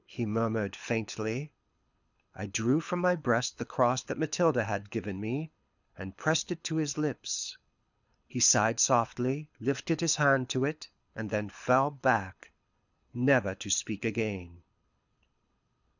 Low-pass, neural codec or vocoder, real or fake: 7.2 kHz; codec, 24 kHz, 6 kbps, HILCodec; fake